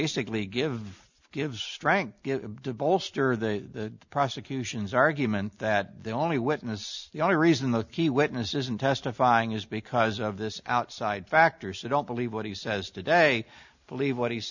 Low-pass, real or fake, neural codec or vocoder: 7.2 kHz; real; none